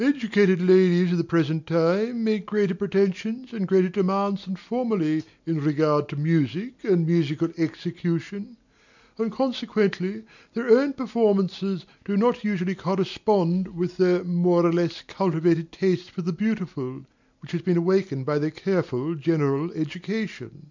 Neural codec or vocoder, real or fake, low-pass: none; real; 7.2 kHz